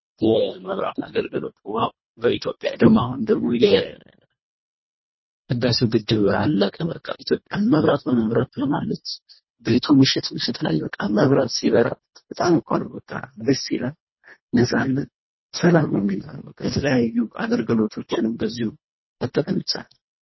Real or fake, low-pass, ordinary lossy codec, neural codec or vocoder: fake; 7.2 kHz; MP3, 24 kbps; codec, 24 kHz, 1.5 kbps, HILCodec